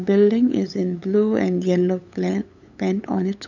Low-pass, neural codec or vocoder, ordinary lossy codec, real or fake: 7.2 kHz; codec, 16 kHz, 8 kbps, FunCodec, trained on LibriTTS, 25 frames a second; none; fake